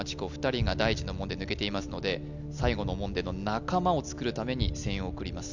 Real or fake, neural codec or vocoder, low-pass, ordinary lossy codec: real; none; 7.2 kHz; none